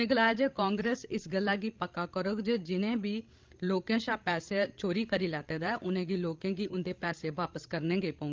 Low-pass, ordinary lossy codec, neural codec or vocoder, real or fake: 7.2 kHz; Opus, 32 kbps; codec, 16 kHz, 16 kbps, FreqCodec, larger model; fake